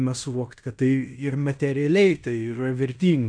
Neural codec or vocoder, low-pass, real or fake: codec, 16 kHz in and 24 kHz out, 0.9 kbps, LongCat-Audio-Codec, fine tuned four codebook decoder; 9.9 kHz; fake